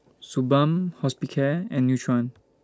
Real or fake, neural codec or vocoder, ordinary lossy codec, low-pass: real; none; none; none